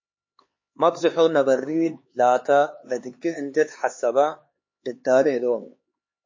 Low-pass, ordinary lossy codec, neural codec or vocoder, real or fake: 7.2 kHz; MP3, 32 kbps; codec, 16 kHz, 4 kbps, X-Codec, HuBERT features, trained on LibriSpeech; fake